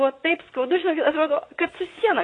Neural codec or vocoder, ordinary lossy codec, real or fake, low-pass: vocoder, 22.05 kHz, 80 mel bands, Vocos; AAC, 32 kbps; fake; 9.9 kHz